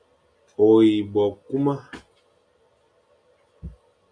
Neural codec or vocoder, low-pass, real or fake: none; 9.9 kHz; real